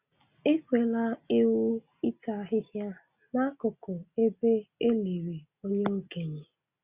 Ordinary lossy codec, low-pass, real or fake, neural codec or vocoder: Opus, 64 kbps; 3.6 kHz; real; none